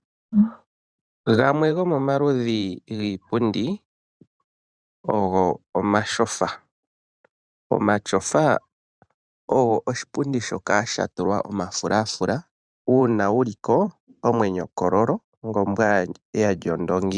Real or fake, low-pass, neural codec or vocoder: fake; 9.9 kHz; vocoder, 44.1 kHz, 128 mel bands every 512 samples, BigVGAN v2